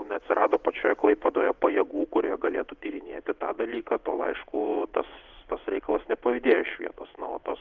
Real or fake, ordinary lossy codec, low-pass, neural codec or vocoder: fake; Opus, 32 kbps; 7.2 kHz; vocoder, 22.05 kHz, 80 mel bands, WaveNeXt